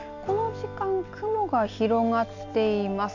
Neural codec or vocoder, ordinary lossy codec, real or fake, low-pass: none; none; real; 7.2 kHz